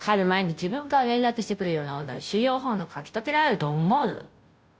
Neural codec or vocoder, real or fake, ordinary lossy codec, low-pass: codec, 16 kHz, 0.5 kbps, FunCodec, trained on Chinese and English, 25 frames a second; fake; none; none